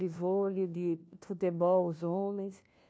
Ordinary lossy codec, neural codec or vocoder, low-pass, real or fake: none; codec, 16 kHz, 1 kbps, FunCodec, trained on LibriTTS, 50 frames a second; none; fake